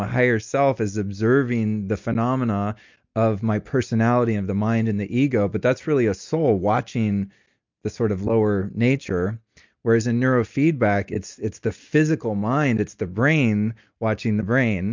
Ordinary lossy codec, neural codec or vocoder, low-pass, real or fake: MP3, 64 kbps; none; 7.2 kHz; real